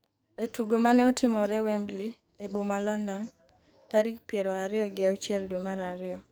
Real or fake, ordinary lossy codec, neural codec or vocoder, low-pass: fake; none; codec, 44.1 kHz, 2.6 kbps, SNAC; none